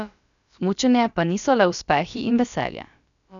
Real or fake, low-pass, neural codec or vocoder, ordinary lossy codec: fake; 7.2 kHz; codec, 16 kHz, about 1 kbps, DyCAST, with the encoder's durations; none